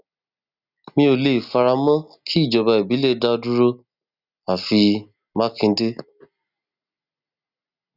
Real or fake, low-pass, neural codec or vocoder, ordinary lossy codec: real; 5.4 kHz; none; none